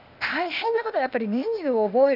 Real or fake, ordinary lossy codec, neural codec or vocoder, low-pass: fake; none; codec, 16 kHz, 0.8 kbps, ZipCodec; 5.4 kHz